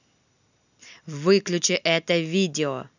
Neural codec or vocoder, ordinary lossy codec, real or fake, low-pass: none; none; real; 7.2 kHz